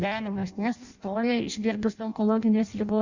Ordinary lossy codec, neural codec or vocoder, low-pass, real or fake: Opus, 64 kbps; codec, 16 kHz in and 24 kHz out, 0.6 kbps, FireRedTTS-2 codec; 7.2 kHz; fake